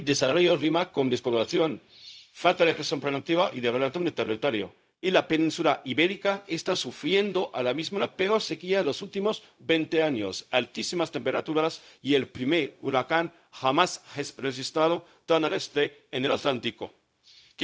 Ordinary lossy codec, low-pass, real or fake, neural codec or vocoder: none; none; fake; codec, 16 kHz, 0.4 kbps, LongCat-Audio-Codec